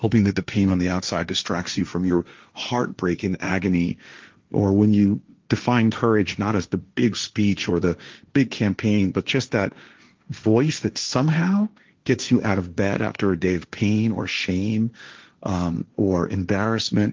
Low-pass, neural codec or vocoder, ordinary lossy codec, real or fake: 7.2 kHz; codec, 16 kHz, 1.1 kbps, Voila-Tokenizer; Opus, 32 kbps; fake